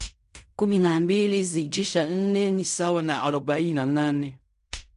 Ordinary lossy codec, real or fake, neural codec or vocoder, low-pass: none; fake; codec, 16 kHz in and 24 kHz out, 0.4 kbps, LongCat-Audio-Codec, fine tuned four codebook decoder; 10.8 kHz